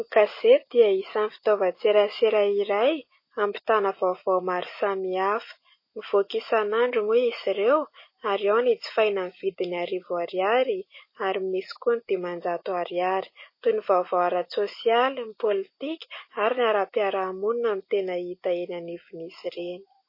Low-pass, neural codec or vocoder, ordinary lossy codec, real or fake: 5.4 kHz; none; MP3, 24 kbps; real